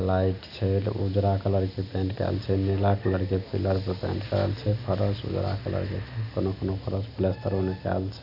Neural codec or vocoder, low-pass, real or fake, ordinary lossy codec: none; 5.4 kHz; real; none